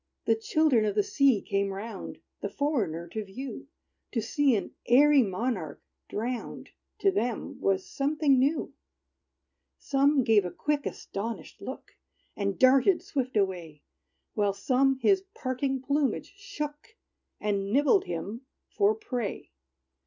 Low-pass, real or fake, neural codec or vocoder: 7.2 kHz; real; none